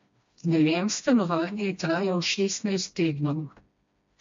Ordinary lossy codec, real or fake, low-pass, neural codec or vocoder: MP3, 48 kbps; fake; 7.2 kHz; codec, 16 kHz, 1 kbps, FreqCodec, smaller model